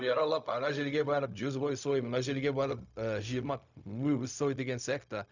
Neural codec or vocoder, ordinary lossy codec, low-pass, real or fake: codec, 16 kHz, 0.4 kbps, LongCat-Audio-Codec; none; 7.2 kHz; fake